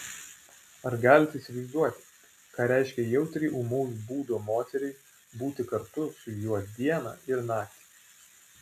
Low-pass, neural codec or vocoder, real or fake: 14.4 kHz; none; real